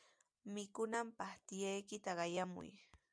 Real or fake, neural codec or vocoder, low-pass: real; none; 9.9 kHz